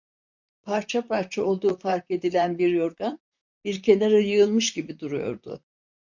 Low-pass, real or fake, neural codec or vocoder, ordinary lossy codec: 7.2 kHz; fake; vocoder, 44.1 kHz, 128 mel bands, Pupu-Vocoder; MP3, 64 kbps